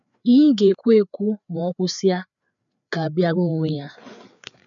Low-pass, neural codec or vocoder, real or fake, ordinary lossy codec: 7.2 kHz; codec, 16 kHz, 4 kbps, FreqCodec, larger model; fake; none